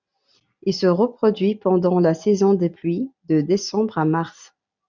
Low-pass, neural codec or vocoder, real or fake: 7.2 kHz; none; real